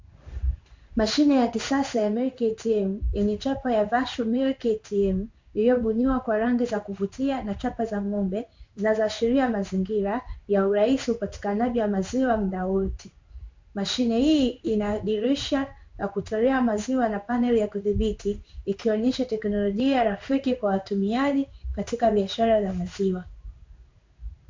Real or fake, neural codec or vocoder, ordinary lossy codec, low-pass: fake; codec, 16 kHz in and 24 kHz out, 1 kbps, XY-Tokenizer; MP3, 64 kbps; 7.2 kHz